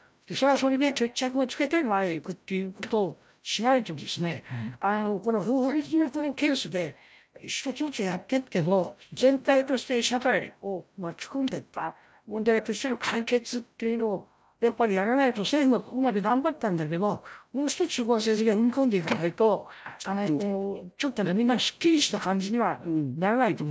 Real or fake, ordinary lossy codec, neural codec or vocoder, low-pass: fake; none; codec, 16 kHz, 0.5 kbps, FreqCodec, larger model; none